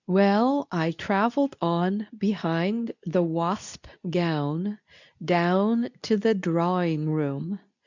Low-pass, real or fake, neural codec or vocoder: 7.2 kHz; fake; codec, 24 kHz, 0.9 kbps, WavTokenizer, medium speech release version 2